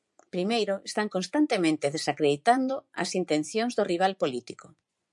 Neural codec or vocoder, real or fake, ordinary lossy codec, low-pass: vocoder, 24 kHz, 100 mel bands, Vocos; fake; MP3, 96 kbps; 10.8 kHz